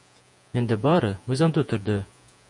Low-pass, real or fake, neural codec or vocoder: 10.8 kHz; fake; vocoder, 48 kHz, 128 mel bands, Vocos